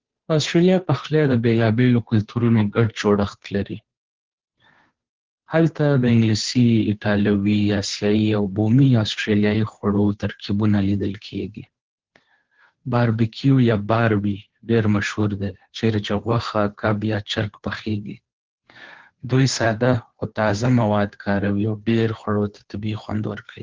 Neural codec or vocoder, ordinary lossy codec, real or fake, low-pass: codec, 16 kHz, 2 kbps, FunCodec, trained on Chinese and English, 25 frames a second; Opus, 16 kbps; fake; 7.2 kHz